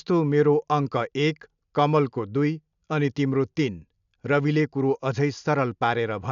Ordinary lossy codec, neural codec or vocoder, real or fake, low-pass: none; none; real; 7.2 kHz